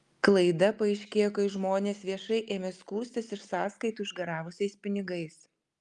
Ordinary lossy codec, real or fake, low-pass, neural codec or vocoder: Opus, 32 kbps; real; 10.8 kHz; none